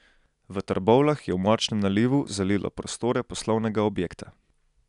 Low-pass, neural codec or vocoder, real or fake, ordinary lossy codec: 10.8 kHz; none; real; none